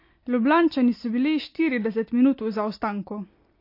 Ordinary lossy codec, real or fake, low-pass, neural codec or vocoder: MP3, 32 kbps; real; 5.4 kHz; none